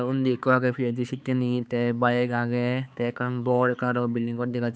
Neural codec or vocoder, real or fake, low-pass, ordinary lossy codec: codec, 16 kHz, 4 kbps, X-Codec, HuBERT features, trained on balanced general audio; fake; none; none